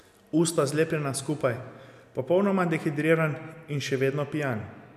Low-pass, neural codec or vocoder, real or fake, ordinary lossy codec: 14.4 kHz; none; real; none